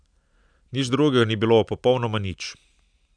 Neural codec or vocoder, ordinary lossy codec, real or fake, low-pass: none; none; real; 9.9 kHz